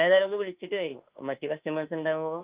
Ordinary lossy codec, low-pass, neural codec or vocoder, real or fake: Opus, 32 kbps; 3.6 kHz; autoencoder, 48 kHz, 32 numbers a frame, DAC-VAE, trained on Japanese speech; fake